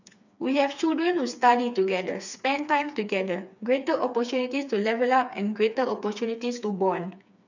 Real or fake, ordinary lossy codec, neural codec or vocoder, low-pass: fake; none; codec, 16 kHz, 4 kbps, FreqCodec, smaller model; 7.2 kHz